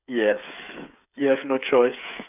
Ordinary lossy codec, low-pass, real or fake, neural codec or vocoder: none; 3.6 kHz; fake; codec, 16 kHz, 8 kbps, FreqCodec, smaller model